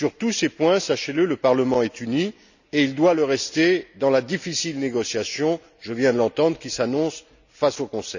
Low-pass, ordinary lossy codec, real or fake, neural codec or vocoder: 7.2 kHz; none; real; none